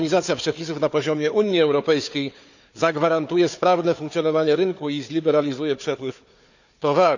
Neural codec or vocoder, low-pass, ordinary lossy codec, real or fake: codec, 16 kHz, 4 kbps, FunCodec, trained on Chinese and English, 50 frames a second; 7.2 kHz; none; fake